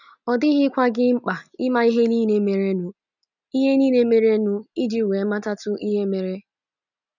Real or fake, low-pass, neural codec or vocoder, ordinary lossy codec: real; 7.2 kHz; none; none